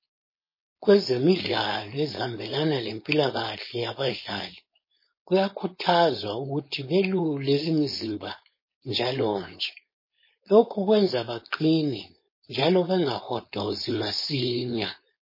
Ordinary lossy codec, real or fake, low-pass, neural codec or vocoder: MP3, 24 kbps; fake; 5.4 kHz; codec, 16 kHz, 4.8 kbps, FACodec